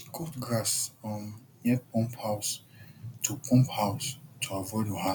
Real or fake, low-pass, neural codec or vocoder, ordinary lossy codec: real; 19.8 kHz; none; none